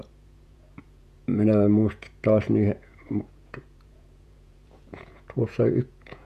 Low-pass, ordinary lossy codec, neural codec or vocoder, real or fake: 14.4 kHz; AAC, 96 kbps; vocoder, 48 kHz, 128 mel bands, Vocos; fake